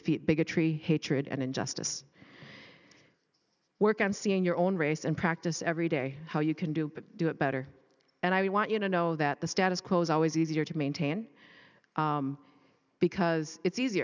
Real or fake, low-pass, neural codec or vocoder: real; 7.2 kHz; none